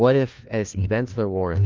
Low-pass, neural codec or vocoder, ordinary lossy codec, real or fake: 7.2 kHz; codec, 16 kHz, 1 kbps, FunCodec, trained on LibriTTS, 50 frames a second; Opus, 24 kbps; fake